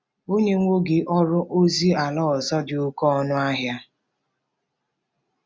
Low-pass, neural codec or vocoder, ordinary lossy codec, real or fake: none; none; none; real